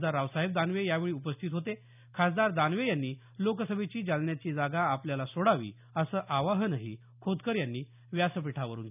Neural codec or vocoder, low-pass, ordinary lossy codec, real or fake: none; 3.6 kHz; none; real